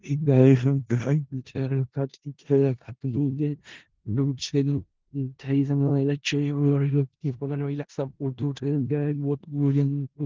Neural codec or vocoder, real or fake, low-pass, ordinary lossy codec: codec, 16 kHz in and 24 kHz out, 0.4 kbps, LongCat-Audio-Codec, four codebook decoder; fake; 7.2 kHz; Opus, 16 kbps